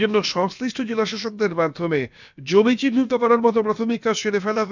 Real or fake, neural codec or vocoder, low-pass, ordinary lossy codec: fake; codec, 16 kHz, about 1 kbps, DyCAST, with the encoder's durations; 7.2 kHz; none